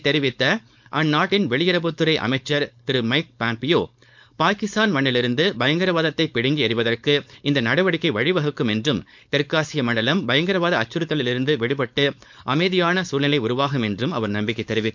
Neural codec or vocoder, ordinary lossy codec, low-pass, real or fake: codec, 16 kHz, 4.8 kbps, FACodec; MP3, 64 kbps; 7.2 kHz; fake